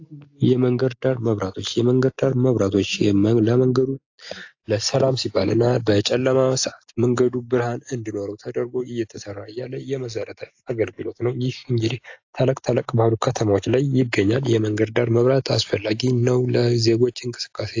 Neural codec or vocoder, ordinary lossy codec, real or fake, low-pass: none; AAC, 48 kbps; real; 7.2 kHz